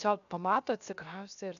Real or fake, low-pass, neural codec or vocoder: fake; 7.2 kHz; codec, 16 kHz, 0.7 kbps, FocalCodec